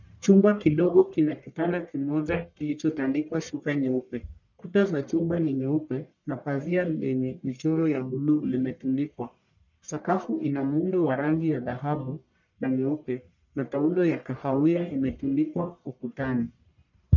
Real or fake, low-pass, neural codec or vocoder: fake; 7.2 kHz; codec, 44.1 kHz, 1.7 kbps, Pupu-Codec